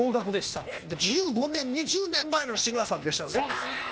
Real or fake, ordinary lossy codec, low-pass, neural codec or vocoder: fake; none; none; codec, 16 kHz, 0.8 kbps, ZipCodec